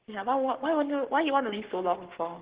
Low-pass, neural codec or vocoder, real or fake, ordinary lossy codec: 3.6 kHz; codec, 44.1 kHz, 7.8 kbps, Pupu-Codec; fake; Opus, 16 kbps